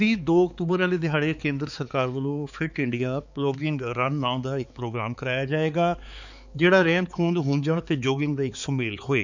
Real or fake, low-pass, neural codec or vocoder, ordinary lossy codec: fake; 7.2 kHz; codec, 16 kHz, 4 kbps, X-Codec, HuBERT features, trained on balanced general audio; none